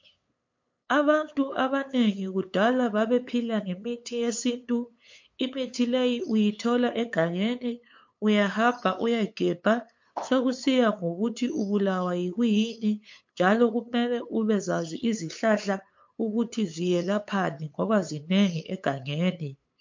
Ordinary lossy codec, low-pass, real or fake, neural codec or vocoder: MP3, 48 kbps; 7.2 kHz; fake; codec, 16 kHz, 8 kbps, FunCodec, trained on LibriTTS, 25 frames a second